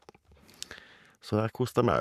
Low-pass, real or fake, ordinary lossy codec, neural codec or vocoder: 14.4 kHz; fake; none; codec, 44.1 kHz, 7.8 kbps, Pupu-Codec